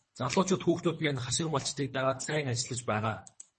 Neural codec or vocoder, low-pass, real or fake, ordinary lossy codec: codec, 24 kHz, 3 kbps, HILCodec; 10.8 kHz; fake; MP3, 32 kbps